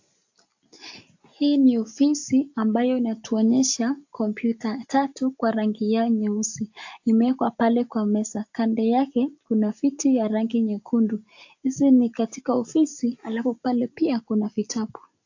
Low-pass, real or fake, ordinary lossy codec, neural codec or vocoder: 7.2 kHz; real; AAC, 48 kbps; none